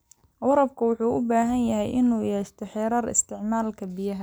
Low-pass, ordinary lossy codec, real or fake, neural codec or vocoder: none; none; real; none